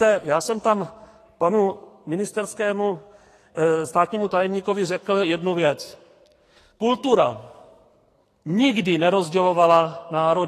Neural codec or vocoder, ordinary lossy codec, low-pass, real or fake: codec, 44.1 kHz, 2.6 kbps, SNAC; AAC, 48 kbps; 14.4 kHz; fake